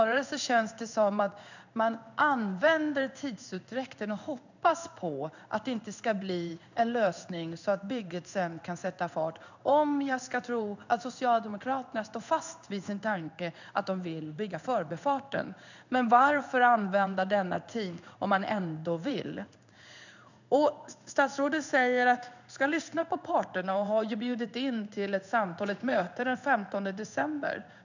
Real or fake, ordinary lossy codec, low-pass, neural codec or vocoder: fake; none; 7.2 kHz; codec, 16 kHz in and 24 kHz out, 1 kbps, XY-Tokenizer